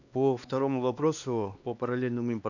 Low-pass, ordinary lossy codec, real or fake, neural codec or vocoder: 7.2 kHz; none; fake; codec, 16 kHz, 2 kbps, X-Codec, HuBERT features, trained on LibriSpeech